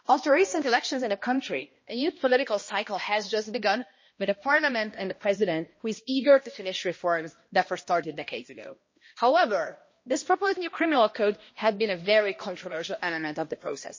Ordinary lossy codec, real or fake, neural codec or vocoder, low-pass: MP3, 32 kbps; fake; codec, 16 kHz, 1 kbps, X-Codec, HuBERT features, trained on balanced general audio; 7.2 kHz